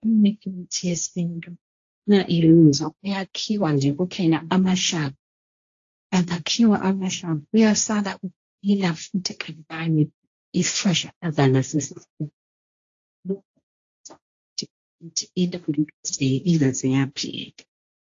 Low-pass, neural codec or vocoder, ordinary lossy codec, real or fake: 7.2 kHz; codec, 16 kHz, 1.1 kbps, Voila-Tokenizer; AAC, 48 kbps; fake